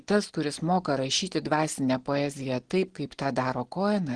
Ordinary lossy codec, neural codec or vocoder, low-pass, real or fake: Opus, 16 kbps; none; 9.9 kHz; real